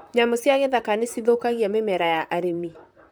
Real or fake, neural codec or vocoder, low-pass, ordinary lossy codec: fake; vocoder, 44.1 kHz, 128 mel bands, Pupu-Vocoder; none; none